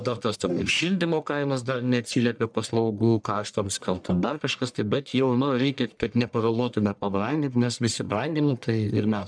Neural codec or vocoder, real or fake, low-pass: codec, 44.1 kHz, 1.7 kbps, Pupu-Codec; fake; 9.9 kHz